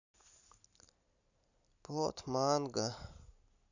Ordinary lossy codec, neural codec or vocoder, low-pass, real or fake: none; none; 7.2 kHz; real